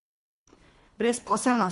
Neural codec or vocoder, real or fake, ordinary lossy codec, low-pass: codec, 24 kHz, 3 kbps, HILCodec; fake; MP3, 64 kbps; 10.8 kHz